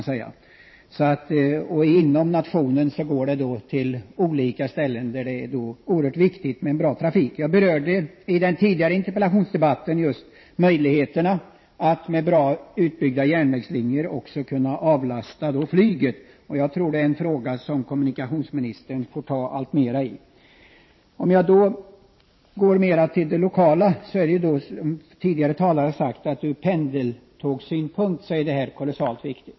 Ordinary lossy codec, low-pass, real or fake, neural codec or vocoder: MP3, 24 kbps; 7.2 kHz; fake; vocoder, 44.1 kHz, 128 mel bands every 512 samples, BigVGAN v2